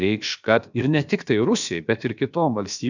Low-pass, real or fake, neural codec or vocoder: 7.2 kHz; fake; codec, 16 kHz, about 1 kbps, DyCAST, with the encoder's durations